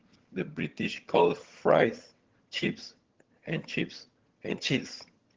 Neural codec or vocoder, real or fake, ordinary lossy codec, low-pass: vocoder, 22.05 kHz, 80 mel bands, HiFi-GAN; fake; Opus, 16 kbps; 7.2 kHz